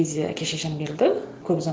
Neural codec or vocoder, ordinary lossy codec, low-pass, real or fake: none; none; none; real